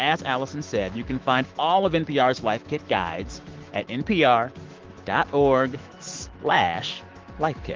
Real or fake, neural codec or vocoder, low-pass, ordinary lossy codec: fake; autoencoder, 48 kHz, 128 numbers a frame, DAC-VAE, trained on Japanese speech; 7.2 kHz; Opus, 16 kbps